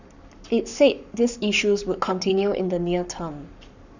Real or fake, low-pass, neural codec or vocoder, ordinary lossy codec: fake; 7.2 kHz; codec, 44.1 kHz, 7.8 kbps, Pupu-Codec; none